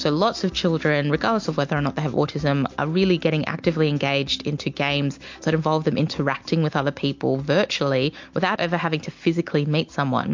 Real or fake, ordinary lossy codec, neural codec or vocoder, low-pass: real; MP3, 48 kbps; none; 7.2 kHz